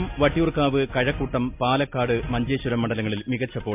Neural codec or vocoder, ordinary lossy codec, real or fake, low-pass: none; MP3, 32 kbps; real; 3.6 kHz